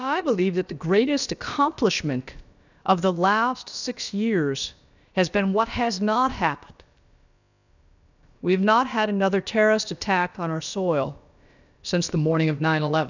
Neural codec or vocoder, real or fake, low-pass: codec, 16 kHz, about 1 kbps, DyCAST, with the encoder's durations; fake; 7.2 kHz